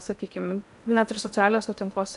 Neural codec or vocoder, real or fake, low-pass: codec, 16 kHz in and 24 kHz out, 0.8 kbps, FocalCodec, streaming, 65536 codes; fake; 10.8 kHz